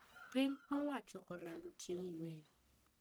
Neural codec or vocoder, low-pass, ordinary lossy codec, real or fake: codec, 44.1 kHz, 1.7 kbps, Pupu-Codec; none; none; fake